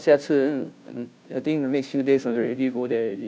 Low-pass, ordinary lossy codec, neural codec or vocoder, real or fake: none; none; codec, 16 kHz, 0.5 kbps, FunCodec, trained on Chinese and English, 25 frames a second; fake